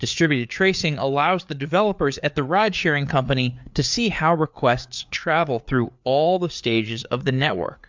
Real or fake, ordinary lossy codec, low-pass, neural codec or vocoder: fake; MP3, 64 kbps; 7.2 kHz; codec, 16 kHz, 4 kbps, FreqCodec, larger model